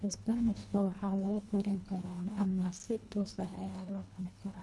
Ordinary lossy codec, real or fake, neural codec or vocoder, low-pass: none; fake; codec, 24 kHz, 1.5 kbps, HILCodec; none